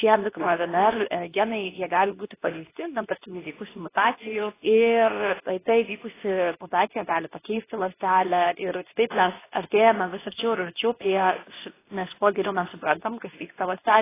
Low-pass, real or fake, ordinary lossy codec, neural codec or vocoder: 3.6 kHz; fake; AAC, 16 kbps; codec, 24 kHz, 0.9 kbps, WavTokenizer, medium speech release version 2